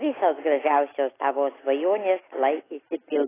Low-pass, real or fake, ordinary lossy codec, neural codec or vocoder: 3.6 kHz; real; AAC, 16 kbps; none